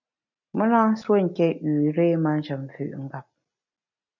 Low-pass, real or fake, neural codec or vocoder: 7.2 kHz; real; none